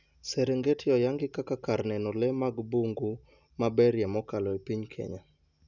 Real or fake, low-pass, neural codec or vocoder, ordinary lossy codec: real; 7.2 kHz; none; none